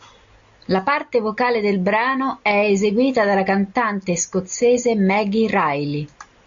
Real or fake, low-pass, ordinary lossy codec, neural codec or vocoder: real; 7.2 kHz; AAC, 64 kbps; none